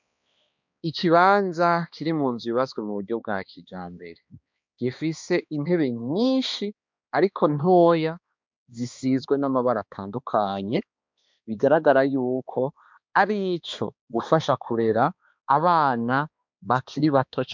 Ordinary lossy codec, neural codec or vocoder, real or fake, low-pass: MP3, 64 kbps; codec, 16 kHz, 2 kbps, X-Codec, HuBERT features, trained on balanced general audio; fake; 7.2 kHz